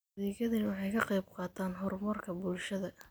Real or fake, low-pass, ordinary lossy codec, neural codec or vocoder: real; none; none; none